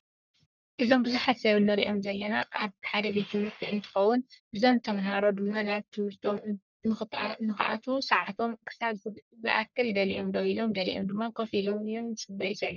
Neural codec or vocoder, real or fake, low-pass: codec, 44.1 kHz, 1.7 kbps, Pupu-Codec; fake; 7.2 kHz